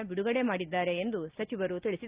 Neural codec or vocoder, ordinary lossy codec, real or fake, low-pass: none; Opus, 32 kbps; real; 3.6 kHz